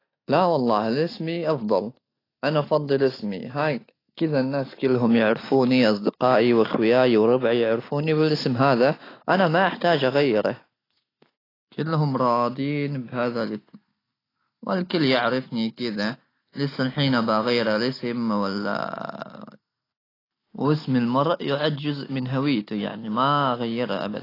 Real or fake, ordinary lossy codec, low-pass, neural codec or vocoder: real; AAC, 24 kbps; 5.4 kHz; none